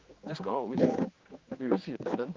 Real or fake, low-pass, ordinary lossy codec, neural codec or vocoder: fake; 7.2 kHz; Opus, 32 kbps; codec, 16 kHz, 2 kbps, X-Codec, HuBERT features, trained on general audio